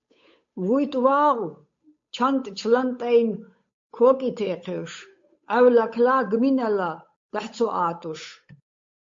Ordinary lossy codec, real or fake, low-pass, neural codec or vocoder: MP3, 48 kbps; fake; 7.2 kHz; codec, 16 kHz, 8 kbps, FunCodec, trained on Chinese and English, 25 frames a second